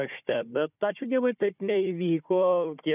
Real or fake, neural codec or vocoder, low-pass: fake; codec, 16 kHz, 4 kbps, FunCodec, trained on Chinese and English, 50 frames a second; 3.6 kHz